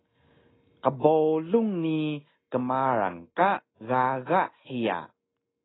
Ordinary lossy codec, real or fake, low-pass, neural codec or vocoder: AAC, 16 kbps; real; 7.2 kHz; none